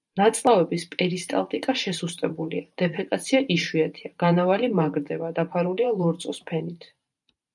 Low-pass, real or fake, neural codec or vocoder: 10.8 kHz; fake; vocoder, 44.1 kHz, 128 mel bands every 512 samples, BigVGAN v2